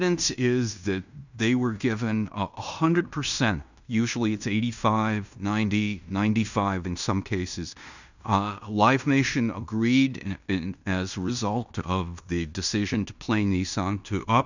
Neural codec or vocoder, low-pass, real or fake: codec, 16 kHz in and 24 kHz out, 0.9 kbps, LongCat-Audio-Codec, fine tuned four codebook decoder; 7.2 kHz; fake